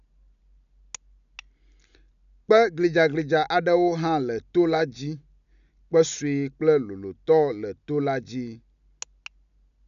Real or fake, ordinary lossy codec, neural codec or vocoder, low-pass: real; MP3, 96 kbps; none; 7.2 kHz